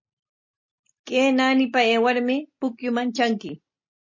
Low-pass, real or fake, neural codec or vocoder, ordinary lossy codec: 7.2 kHz; real; none; MP3, 32 kbps